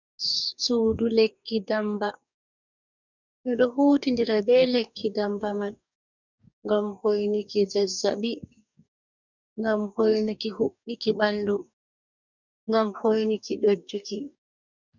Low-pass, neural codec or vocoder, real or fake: 7.2 kHz; codec, 44.1 kHz, 2.6 kbps, DAC; fake